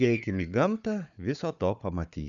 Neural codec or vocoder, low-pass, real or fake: codec, 16 kHz, 4 kbps, FunCodec, trained on Chinese and English, 50 frames a second; 7.2 kHz; fake